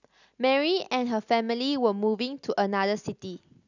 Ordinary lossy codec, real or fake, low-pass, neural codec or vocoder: none; real; 7.2 kHz; none